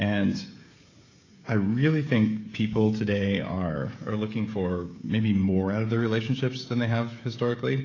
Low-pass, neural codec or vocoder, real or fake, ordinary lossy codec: 7.2 kHz; codec, 16 kHz, 16 kbps, FreqCodec, smaller model; fake; AAC, 32 kbps